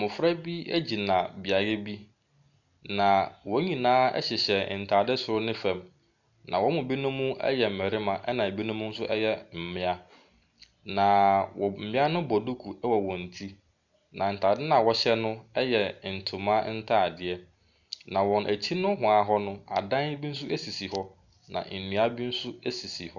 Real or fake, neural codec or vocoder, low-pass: real; none; 7.2 kHz